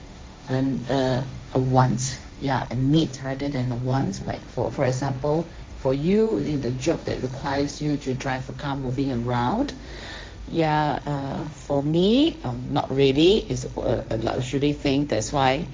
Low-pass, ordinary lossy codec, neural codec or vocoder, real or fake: none; none; codec, 16 kHz, 1.1 kbps, Voila-Tokenizer; fake